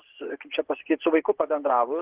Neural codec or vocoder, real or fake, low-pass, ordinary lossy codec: vocoder, 24 kHz, 100 mel bands, Vocos; fake; 3.6 kHz; Opus, 16 kbps